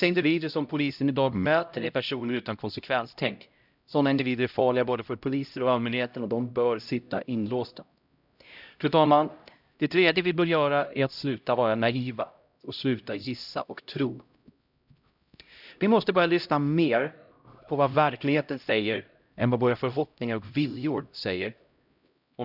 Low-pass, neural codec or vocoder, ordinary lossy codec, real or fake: 5.4 kHz; codec, 16 kHz, 0.5 kbps, X-Codec, HuBERT features, trained on LibriSpeech; none; fake